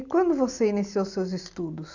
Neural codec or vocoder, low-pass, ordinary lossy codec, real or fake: none; 7.2 kHz; none; real